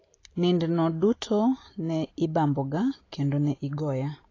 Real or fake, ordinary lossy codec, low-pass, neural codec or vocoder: real; AAC, 32 kbps; 7.2 kHz; none